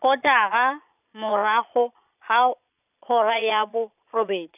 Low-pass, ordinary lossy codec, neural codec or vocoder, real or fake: 3.6 kHz; none; vocoder, 22.05 kHz, 80 mel bands, Vocos; fake